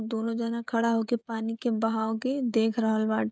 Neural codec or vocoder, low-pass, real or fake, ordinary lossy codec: codec, 16 kHz, 16 kbps, FreqCodec, smaller model; none; fake; none